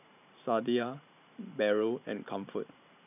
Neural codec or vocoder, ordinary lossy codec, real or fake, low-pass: vocoder, 44.1 kHz, 128 mel bands every 512 samples, BigVGAN v2; none; fake; 3.6 kHz